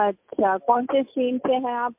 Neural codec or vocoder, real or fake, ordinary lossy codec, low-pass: none; real; MP3, 32 kbps; 3.6 kHz